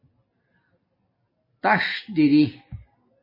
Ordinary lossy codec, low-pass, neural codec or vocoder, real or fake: MP3, 24 kbps; 5.4 kHz; none; real